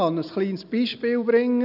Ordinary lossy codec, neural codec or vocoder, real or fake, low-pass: none; none; real; 5.4 kHz